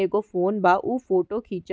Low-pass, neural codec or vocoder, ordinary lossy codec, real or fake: none; none; none; real